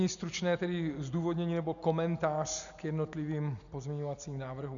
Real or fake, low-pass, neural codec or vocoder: real; 7.2 kHz; none